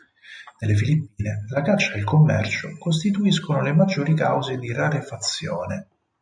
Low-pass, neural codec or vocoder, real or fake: 9.9 kHz; none; real